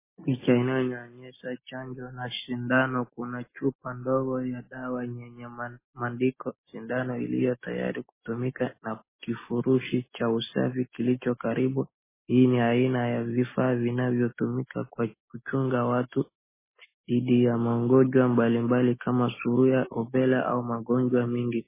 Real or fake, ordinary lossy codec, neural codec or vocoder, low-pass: real; MP3, 16 kbps; none; 3.6 kHz